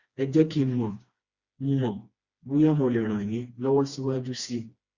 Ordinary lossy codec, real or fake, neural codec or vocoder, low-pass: Opus, 64 kbps; fake; codec, 16 kHz, 2 kbps, FreqCodec, smaller model; 7.2 kHz